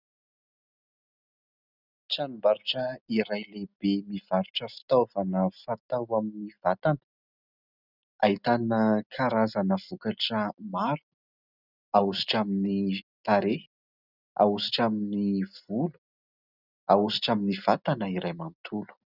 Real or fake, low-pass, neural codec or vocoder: real; 5.4 kHz; none